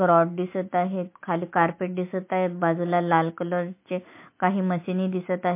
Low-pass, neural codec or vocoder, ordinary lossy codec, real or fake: 3.6 kHz; none; MP3, 24 kbps; real